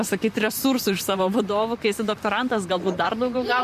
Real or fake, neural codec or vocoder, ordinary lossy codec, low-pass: fake; vocoder, 44.1 kHz, 128 mel bands, Pupu-Vocoder; MP3, 64 kbps; 14.4 kHz